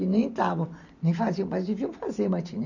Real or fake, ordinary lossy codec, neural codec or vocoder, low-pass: real; none; none; 7.2 kHz